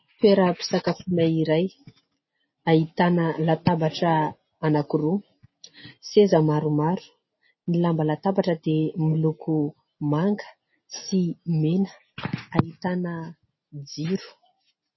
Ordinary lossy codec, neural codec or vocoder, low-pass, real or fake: MP3, 24 kbps; none; 7.2 kHz; real